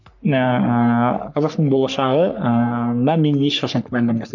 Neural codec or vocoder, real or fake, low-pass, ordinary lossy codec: codec, 44.1 kHz, 3.4 kbps, Pupu-Codec; fake; 7.2 kHz; none